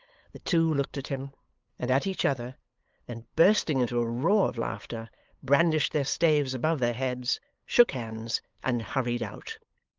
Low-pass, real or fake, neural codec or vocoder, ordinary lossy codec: 7.2 kHz; fake; codec, 16 kHz, 8 kbps, FunCodec, trained on LibriTTS, 25 frames a second; Opus, 24 kbps